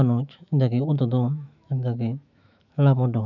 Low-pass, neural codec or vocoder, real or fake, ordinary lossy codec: 7.2 kHz; autoencoder, 48 kHz, 128 numbers a frame, DAC-VAE, trained on Japanese speech; fake; none